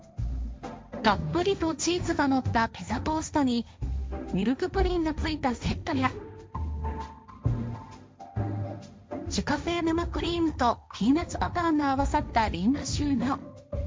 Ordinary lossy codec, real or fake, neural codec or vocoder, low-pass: none; fake; codec, 16 kHz, 1.1 kbps, Voila-Tokenizer; none